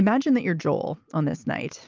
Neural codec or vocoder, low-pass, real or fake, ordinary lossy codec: none; 7.2 kHz; real; Opus, 32 kbps